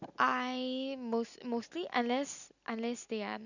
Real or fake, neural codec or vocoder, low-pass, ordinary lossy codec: real; none; 7.2 kHz; none